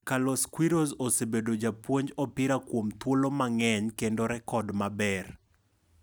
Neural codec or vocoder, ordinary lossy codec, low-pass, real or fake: none; none; none; real